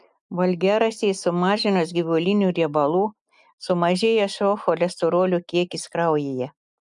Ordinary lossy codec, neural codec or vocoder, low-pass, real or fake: MP3, 96 kbps; none; 10.8 kHz; real